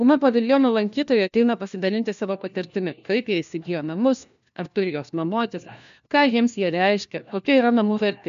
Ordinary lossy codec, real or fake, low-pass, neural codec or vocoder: AAC, 96 kbps; fake; 7.2 kHz; codec, 16 kHz, 1 kbps, FunCodec, trained on LibriTTS, 50 frames a second